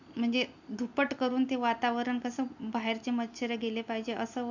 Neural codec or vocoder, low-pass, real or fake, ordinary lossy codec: none; 7.2 kHz; real; none